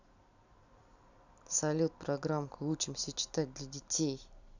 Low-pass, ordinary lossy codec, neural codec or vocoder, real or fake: 7.2 kHz; none; vocoder, 44.1 kHz, 128 mel bands every 256 samples, BigVGAN v2; fake